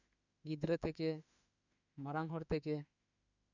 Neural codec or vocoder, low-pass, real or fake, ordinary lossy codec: autoencoder, 48 kHz, 32 numbers a frame, DAC-VAE, trained on Japanese speech; 7.2 kHz; fake; none